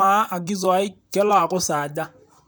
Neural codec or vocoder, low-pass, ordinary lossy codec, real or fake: vocoder, 44.1 kHz, 128 mel bands, Pupu-Vocoder; none; none; fake